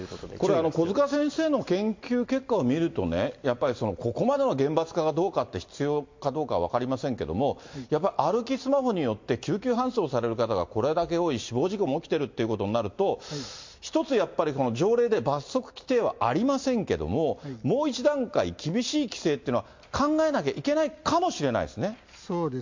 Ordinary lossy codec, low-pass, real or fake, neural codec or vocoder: MP3, 48 kbps; 7.2 kHz; real; none